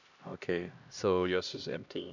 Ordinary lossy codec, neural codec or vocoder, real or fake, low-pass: none; codec, 16 kHz, 1 kbps, X-Codec, HuBERT features, trained on LibriSpeech; fake; 7.2 kHz